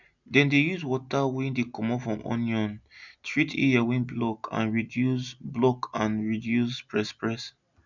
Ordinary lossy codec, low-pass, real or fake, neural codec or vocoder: none; 7.2 kHz; real; none